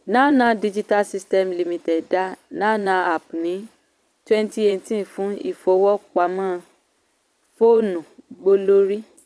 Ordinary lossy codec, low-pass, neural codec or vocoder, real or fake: none; none; vocoder, 22.05 kHz, 80 mel bands, Vocos; fake